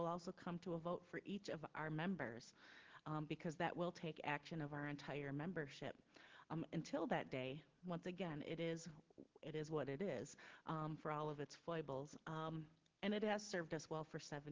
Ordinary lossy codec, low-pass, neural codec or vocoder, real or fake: Opus, 16 kbps; 7.2 kHz; none; real